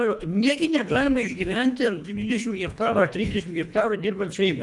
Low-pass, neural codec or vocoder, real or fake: 10.8 kHz; codec, 24 kHz, 1.5 kbps, HILCodec; fake